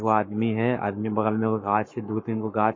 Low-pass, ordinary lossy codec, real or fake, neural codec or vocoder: 7.2 kHz; MP3, 32 kbps; fake; codec, 44.1 kHz, 7.8 kbps, Pupu-Codec